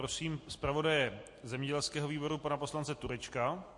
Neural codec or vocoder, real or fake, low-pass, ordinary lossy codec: none; real; 10.8 kHz; MP3, 48 kbps